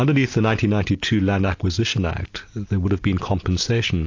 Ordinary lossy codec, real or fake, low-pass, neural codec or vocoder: AAC, 48 kbps; real; 7.2 kHz; none